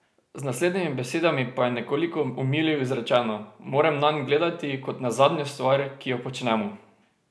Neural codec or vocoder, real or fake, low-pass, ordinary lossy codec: none; real; none; none